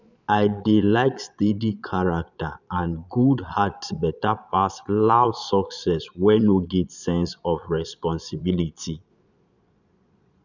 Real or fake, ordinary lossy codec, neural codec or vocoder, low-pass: fake; none; vocoder, 22.05 kHz, 80 mel bands, Vocos; 7.2 kHz